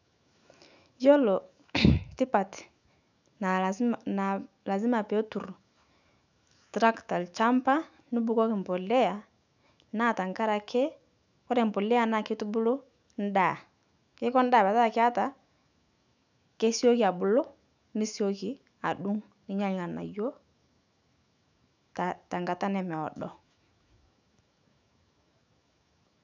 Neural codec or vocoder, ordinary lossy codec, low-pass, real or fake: autoencoder, 48 kHz, 128 numbers a frame, DAC-VAE, trained on Japanese speech; none; 7.2 kHz; fake